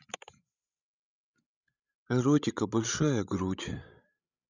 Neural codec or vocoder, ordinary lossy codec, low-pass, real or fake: codec, 16 kHz, 16 kbps, FreqCodec, larger model; none; 7.2 kHz; fake